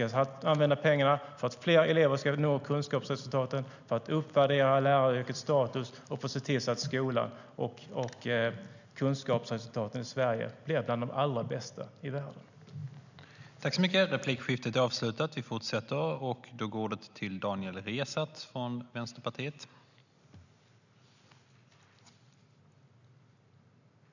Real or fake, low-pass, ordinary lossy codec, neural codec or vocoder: real; 7.2 kHz; none; none